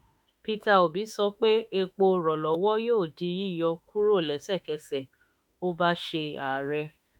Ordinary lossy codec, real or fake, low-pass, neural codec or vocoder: MP3, 96 kbps; fake; 19.8 kHz; autoencoder, 48 kHz, 32 numbers a frame, DAC-VAE, trained on Japanese speech